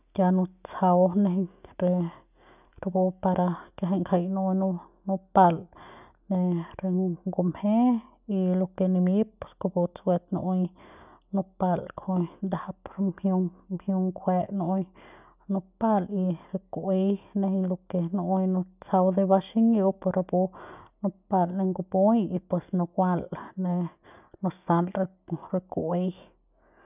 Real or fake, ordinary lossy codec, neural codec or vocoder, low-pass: real; none; none; 3.6 kHz